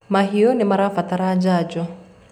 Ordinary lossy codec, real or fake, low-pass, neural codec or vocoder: none; real; 19.8 kHz; none